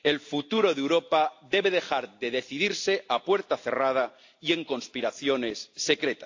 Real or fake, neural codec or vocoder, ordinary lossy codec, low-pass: real; none; AAC, 48 kbps; 7.2 kHz